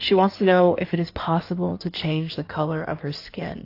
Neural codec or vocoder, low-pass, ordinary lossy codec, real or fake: codec, 16 kHz in and 24 kHz out, 1.1 kbps, FireRedTTS-2 codec; 5.4 kHz; AAC, 32 kbps; fake